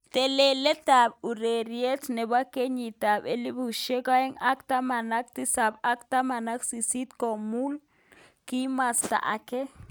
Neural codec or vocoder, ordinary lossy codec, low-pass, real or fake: none; none; none; real